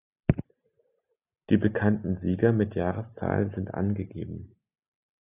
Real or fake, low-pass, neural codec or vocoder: real; 3.6 kHz; none